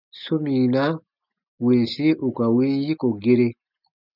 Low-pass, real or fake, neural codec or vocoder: 5.4 kHz; real; none